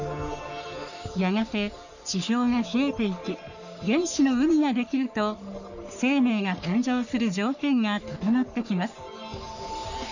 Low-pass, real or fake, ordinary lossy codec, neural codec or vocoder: 7.2 kHz; fake; none; codec, 44.1 kHz, 3.4 kbps, Pupu-Codec